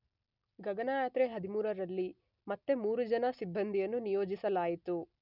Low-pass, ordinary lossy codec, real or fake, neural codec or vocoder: 5.4 kHz; none; real; none